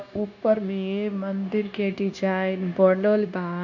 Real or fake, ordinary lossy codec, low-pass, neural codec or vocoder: fake; none; 7.2 kHz; codec, 16 kHz, 0.9 kbps, LongCat-Audio-Codec